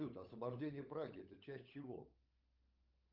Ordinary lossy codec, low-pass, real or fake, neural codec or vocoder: Opus, 24 kbps; 5.4 kHz; fake; codec, 16 kHz, 16 kbps, FunCodec, trained on LibriTTS, 50 frames a second